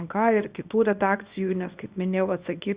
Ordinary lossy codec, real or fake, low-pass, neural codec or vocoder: Opus, 64 kbps; fake; 3.6 kHz; codec, 24 kHz, 0.9 kbps, WavTokenizer, small release